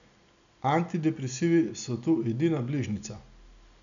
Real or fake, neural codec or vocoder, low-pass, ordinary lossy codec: real; none; 7.2 kHz; none